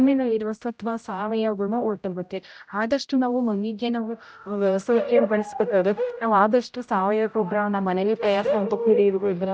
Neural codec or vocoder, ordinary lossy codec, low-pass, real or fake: codec, 16 kHz, 0.5 kbps, X-Codec, HuBERT features, trained on general audio; none; none; fake